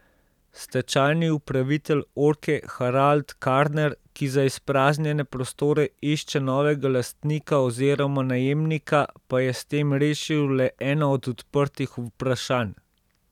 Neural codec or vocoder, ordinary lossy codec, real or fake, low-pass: none; none; real; 19.8 kHz